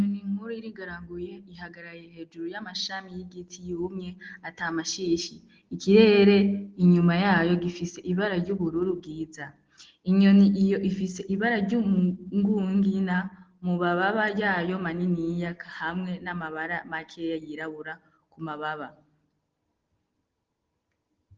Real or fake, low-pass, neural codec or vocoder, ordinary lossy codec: real; 7.2 kHz; none; Opus, 16 kbps